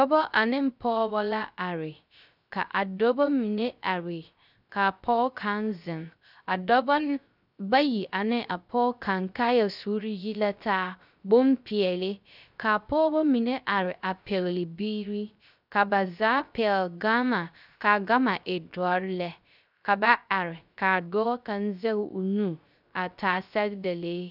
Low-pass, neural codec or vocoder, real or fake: 5.4 kHz; codec, 16 kHz, 0.3 kbps, FocalCodec; fake